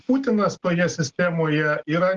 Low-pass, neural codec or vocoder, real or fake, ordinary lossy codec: 7.2 kHz; none; real; Opus, 16 kbps